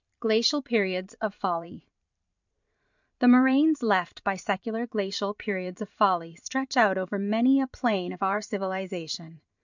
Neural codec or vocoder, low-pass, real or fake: vocoder, 44.1 kHz, 128 mel bands every 512 samples, BigVGAN v2; 7.2 kHz; fake